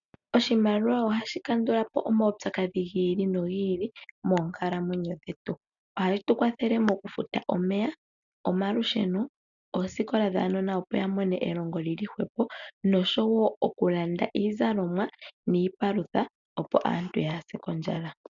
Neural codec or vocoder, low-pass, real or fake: none; 7.2 kHz; real